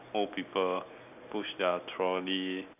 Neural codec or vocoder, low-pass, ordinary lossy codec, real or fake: none; 3.6 kHz; none; real